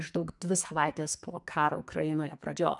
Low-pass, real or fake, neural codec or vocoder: 10.8 kHz; fake; codec, 44.1 kHz, 2.6 kbps, SNAC